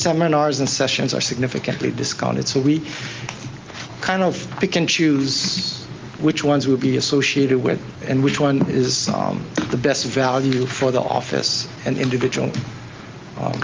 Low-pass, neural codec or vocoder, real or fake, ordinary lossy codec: 7.2 kHz; none; real; Opus, 24 kbps